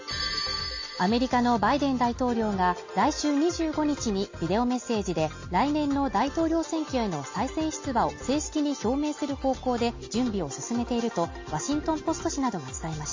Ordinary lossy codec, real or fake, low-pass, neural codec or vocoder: MP3, 32 kbps; real; 7.2 kHz; none